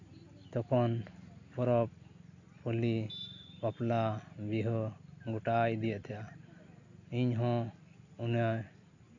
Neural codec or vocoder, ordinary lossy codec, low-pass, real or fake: none; AAC, 48 kbps; 7.2 kHz; real